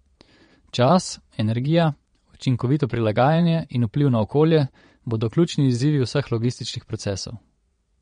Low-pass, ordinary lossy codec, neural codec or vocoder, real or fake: 9.9 kHz; MP3, 48 kbps; vocoder, 22.05 kHz, 80 mel bands, Vocos; fake